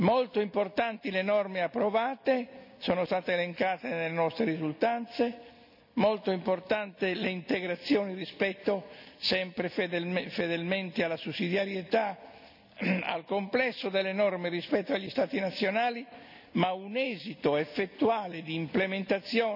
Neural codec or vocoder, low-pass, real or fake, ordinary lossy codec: none; 5.4 kHz; real; none